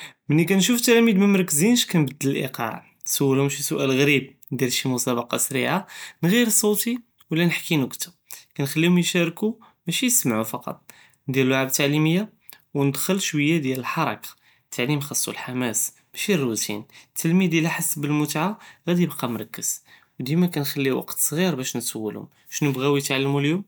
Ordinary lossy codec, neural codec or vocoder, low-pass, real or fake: none; none; none; real